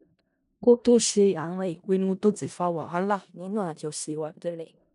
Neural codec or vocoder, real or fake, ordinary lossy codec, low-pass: codec, 16 kHz in and 24 kHz out, 0.4 kbps, LongCat-Audio-Codec, four codebook decoder; fake; none; 10.8 kHz